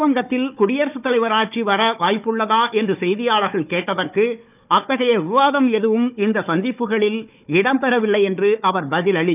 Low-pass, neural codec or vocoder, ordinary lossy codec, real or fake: 3.6 kHz; codec, 16 kHz, 4 kbps, FunCodec, trained on Chinese and English, 50 frames a second; none; fake